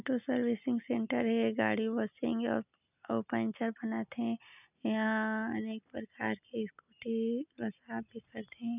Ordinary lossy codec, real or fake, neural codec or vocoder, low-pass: none; real; none; 3.6 kHz